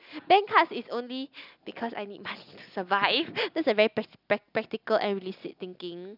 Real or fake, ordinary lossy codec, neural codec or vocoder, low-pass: real; none; none; 5.4 kHz